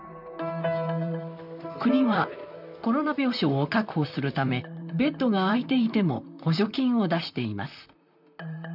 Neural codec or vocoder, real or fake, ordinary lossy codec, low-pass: vocoder, 44.1 kHz, 128 mel bands, Pupu-Vocoder; fake; none; 5.4 kHz